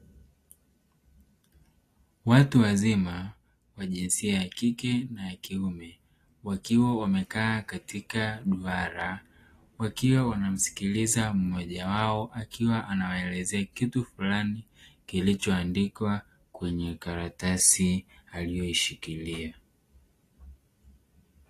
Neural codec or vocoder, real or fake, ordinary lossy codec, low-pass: none; real; AAC, 48 kbps; 14.4 kHz